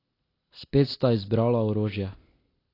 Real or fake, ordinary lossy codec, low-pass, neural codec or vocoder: real; AAC, 24 kbps; 5.4 kHz; none